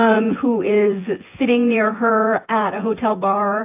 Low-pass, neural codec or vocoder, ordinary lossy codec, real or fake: 3.6 kHz; vocoder, 24 kHz, 100 mel bands, Vocos; AAC, 32 kbps; fake